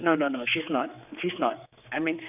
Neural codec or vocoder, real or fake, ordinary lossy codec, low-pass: codec, 16 kHz, 4 kbps, X-Codec, HuBERT features, trained on general audio; fake; none; 3.6 kHz